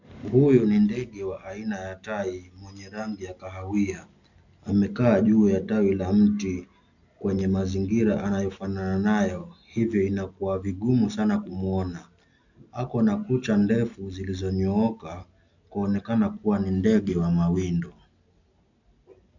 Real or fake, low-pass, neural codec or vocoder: real; 7.2 kHz; none